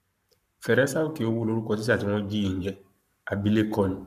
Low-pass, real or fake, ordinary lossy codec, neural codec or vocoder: 14.4 kHz; fake; none; codec, 44.1 kHz, 7.8 kbps, Pupu-Codec